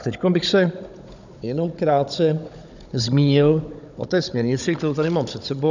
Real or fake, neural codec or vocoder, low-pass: fake; codec, 16 kHz, 16 kbps, FunCodec, trained on Chinese and English, 50 frames a second; 7.2 kHz